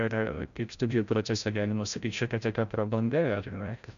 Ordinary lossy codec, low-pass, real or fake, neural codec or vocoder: MP3, 96 kbps; 7.2 kHz; fake; codec, 16 kHz, 0.5 kbps, FreqCodec, larger model